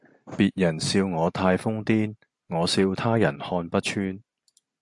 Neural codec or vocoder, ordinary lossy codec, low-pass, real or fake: none; AAC, 64 kbps; 10.8 kHz; real